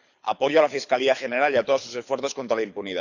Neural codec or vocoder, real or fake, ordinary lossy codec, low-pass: codec, 24 kHz, 6 kbps, HILCodec; fake; none; 7.2 kHz